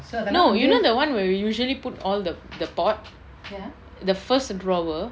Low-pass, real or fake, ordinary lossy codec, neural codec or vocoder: none; real; none; none